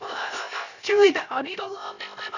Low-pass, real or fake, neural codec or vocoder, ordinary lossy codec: 7.2 kHz; fake; codec, 16 kHz, 0.3 kbps, FocalCodec; none